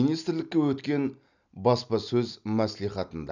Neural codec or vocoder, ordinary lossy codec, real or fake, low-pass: none; none; real; 7.2 kHz